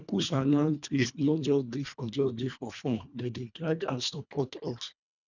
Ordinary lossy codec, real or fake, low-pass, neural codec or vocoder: none; fake; 7.2 kHz; codec, 24 kHz, 1.5 kbps, HILCodec